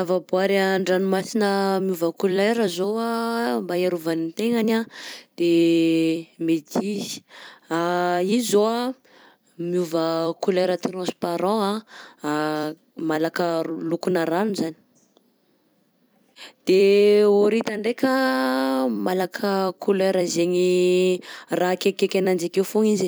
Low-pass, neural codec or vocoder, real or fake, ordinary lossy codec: none; vocoder, 44.1 kHz, 128 mel bands every 256 samples, BigVGAN v2; fake; none